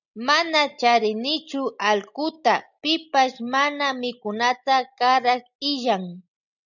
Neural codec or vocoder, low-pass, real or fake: none; 7.2 kHz; real